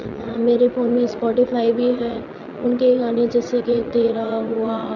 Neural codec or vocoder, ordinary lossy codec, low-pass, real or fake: vocoder, 22.05 kHz, 80 mel bands, Vocos; none; 7.2 kHz; fake